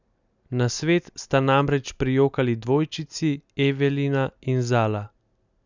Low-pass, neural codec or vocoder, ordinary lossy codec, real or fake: 7.2 kHz; none; none; real